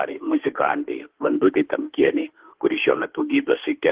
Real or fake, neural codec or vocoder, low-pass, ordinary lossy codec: fake; autoencoder, 48 kHz, 32 numbers a frame, DAC-VAE, trained on Japanese speech; 3.6 kHz; Opus, 16 kbps